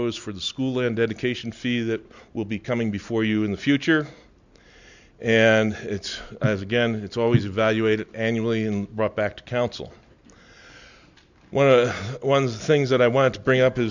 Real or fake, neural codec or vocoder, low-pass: real; none; 7.2 kHz